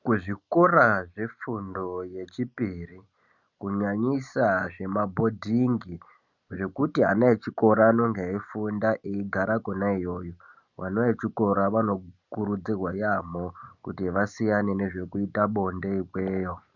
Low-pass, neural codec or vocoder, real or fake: 7.2 kHz; none; real